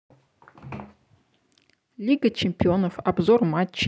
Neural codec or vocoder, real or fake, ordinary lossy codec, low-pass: none; real; none; none